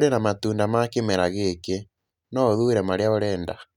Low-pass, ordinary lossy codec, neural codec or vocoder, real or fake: 19.8 kHz; none; none; real